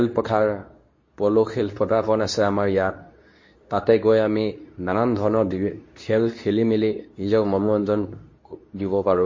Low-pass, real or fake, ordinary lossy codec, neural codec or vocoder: 7.2 kHz; fake; MP3, 32 kbps; codec, 24 kHz, 0.9 kbps, WavTokenizer, medium speech release version 2